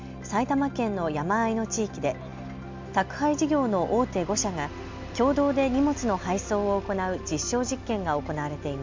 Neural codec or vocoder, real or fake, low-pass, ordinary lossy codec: none; real; 7.2 kHz; MP3, 64 kbps